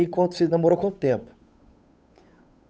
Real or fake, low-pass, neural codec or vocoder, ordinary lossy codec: fake; none; codec, 16 kHz, 8 kbps, FunCodec, trained on Chinese and English, 25 frames a second; none